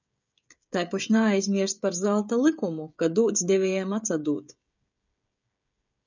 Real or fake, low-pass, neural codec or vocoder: fake; 7.2 kHz; codec, 16 kHz, 16 kbps, FreqCodec, smaller model